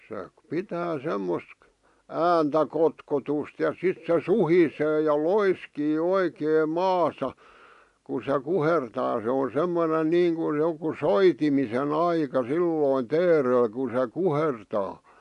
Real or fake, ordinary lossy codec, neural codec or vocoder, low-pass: real; AAC, 64 kbps; none; 10.8 kHz